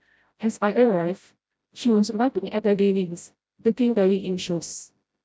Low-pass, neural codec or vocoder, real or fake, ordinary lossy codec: none; codec, 16 kHz, 0.5 kbps, FreqCodec, smaller model; fake; none